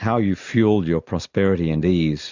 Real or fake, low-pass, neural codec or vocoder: real; 7.2 kHz; none